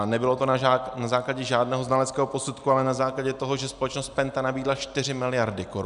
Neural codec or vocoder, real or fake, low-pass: none; real; 10.8 kHz